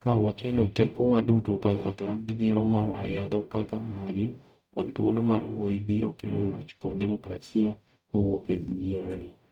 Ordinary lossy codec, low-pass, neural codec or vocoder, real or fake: none; 19.8 kHz; codec, 44.1 kHz, 0.9 kbps, DAC; fake